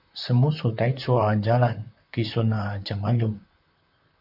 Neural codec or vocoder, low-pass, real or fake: vocoder, 44.1 kHz, 128 mel bands, Pupu-Vocoder; 5.4 kHz; fake